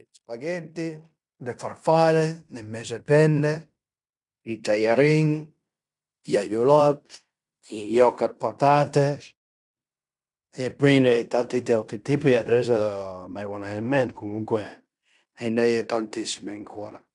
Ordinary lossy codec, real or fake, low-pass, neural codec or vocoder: none; fake; 10.8 kHz; codec, 16 kHz in and 24 kHz out, 0.9 kbps, LongCat-Audio-Codec, fine tuned four codebook decoder